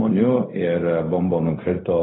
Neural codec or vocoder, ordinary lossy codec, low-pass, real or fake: codec, 16 kHz, 0.4 kbps, LongCat-Audio-Codec; AAC, 16 kbps; 7.2 kHz; fake